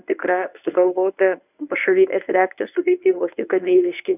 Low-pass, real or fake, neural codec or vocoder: 3.6 kHz; fake; codec, 24 kHz, 0.9 kbps, WavTokenizer, medium speech release version 1